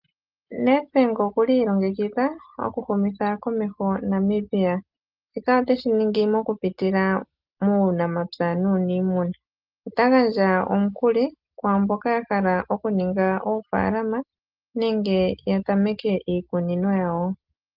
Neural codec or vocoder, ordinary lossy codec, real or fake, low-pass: none; Opus, 32 kbps; real; 5.4 kHz